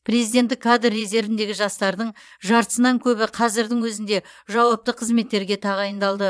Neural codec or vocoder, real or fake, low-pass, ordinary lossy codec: vocoder, 22.05 kHz, 80 mel bands, Vocos; fake; none; none